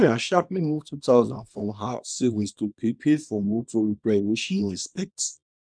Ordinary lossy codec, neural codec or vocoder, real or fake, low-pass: none; codec, 24 kHz, 0.9 kbps, WavTokenizer, small release; fake; 9.9 kHz